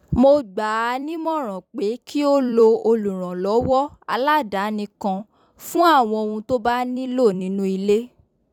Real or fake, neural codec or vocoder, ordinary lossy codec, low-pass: fake; vocoder, 44.1 kHz, 128 mel bands every 256 samples, BigVGAN v2; none; 19.8 kHz